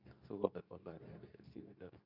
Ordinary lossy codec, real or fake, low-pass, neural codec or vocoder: none; fake; 5.4 kHz; codec, 24 kHz, 0.9 kbps, WavTokenizer, medium speech release version 2